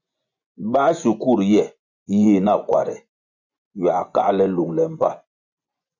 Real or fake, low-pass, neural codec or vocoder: fake; 7.2 kHz; vocoder, 24 kHz, 100 mel bands, Vocos